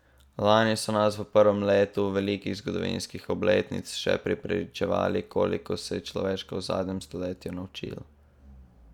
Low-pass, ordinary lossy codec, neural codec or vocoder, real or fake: 19.8 kHz; none; none; real